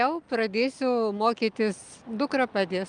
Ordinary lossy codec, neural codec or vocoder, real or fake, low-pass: Opus, 32 kbps; none; real; 9.9 kHz